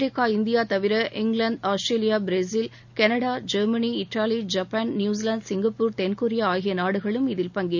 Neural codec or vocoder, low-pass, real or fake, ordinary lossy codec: none; 7.2 kHz; real; none